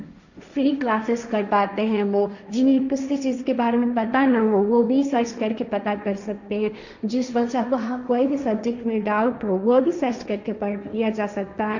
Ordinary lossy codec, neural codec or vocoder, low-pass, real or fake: none; codec, 16 kHz, 1.1 kbps, Voila-Tokenizer; 7.2 kHz; fake